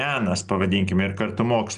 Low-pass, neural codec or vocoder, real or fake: 9.9 kHz; none; real